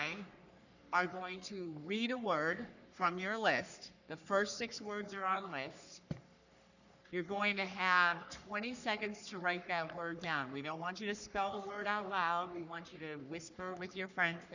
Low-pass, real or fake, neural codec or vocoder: 7.2 kHz; fake; codec, 44.1 kHz, 3.4 kbps, Pupu-Codec